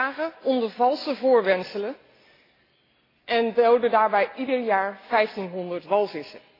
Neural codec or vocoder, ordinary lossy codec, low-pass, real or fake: none; AAC, 24 kbps; 5.4 kHz; real